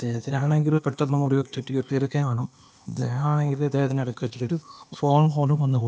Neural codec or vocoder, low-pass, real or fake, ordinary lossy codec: codec, 16 kHz, 0.8 kbps, ZipCodec; none; fake; none